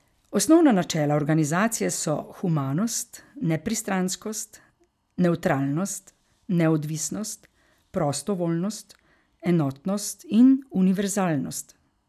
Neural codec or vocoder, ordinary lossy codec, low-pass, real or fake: none; none; 14.4 kHz; real